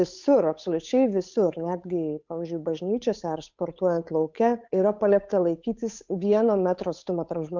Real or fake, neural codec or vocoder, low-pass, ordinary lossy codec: fake; codec, 16 kHz, 8 kbps, FunCodec, trained on Chinese and English, 25 frames a second; 7.2 kHz; MP3, 64 kbps